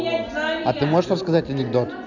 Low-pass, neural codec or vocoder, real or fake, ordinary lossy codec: 7.2 kHz; none; real; none